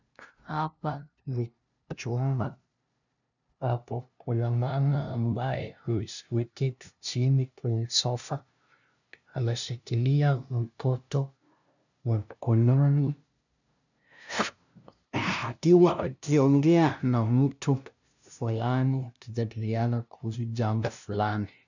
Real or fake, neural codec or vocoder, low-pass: fake; codec, 16 kHz, 0.5 kbps, FunCodec, trained on LibriTTS, 25 frames a second; 7.2 kHz